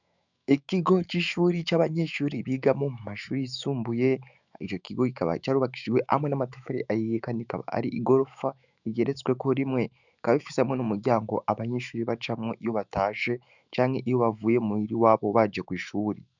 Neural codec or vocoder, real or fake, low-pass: autoencoder, 48 kHz, 128 numbers a frame, DAC-VAE, trained on Japanese speech; fake; 7.2 kHz